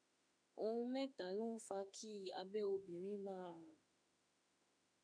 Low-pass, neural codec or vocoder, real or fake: 9.9 kHz; autoencoder, 48 kHz, 32 numbers a frame, DAC-VAE, trained on Japanese speech; fake